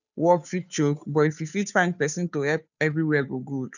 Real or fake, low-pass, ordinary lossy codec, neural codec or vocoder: fake; 7.2 kHz; none; codec, 16 kHz, 2 kbps, FunCodec, trained on Chinese and English, 25 frames a second